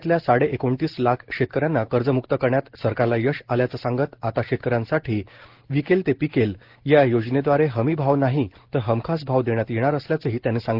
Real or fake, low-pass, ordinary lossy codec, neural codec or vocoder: real; 5.4 kHz; Opus, 16 kbps; none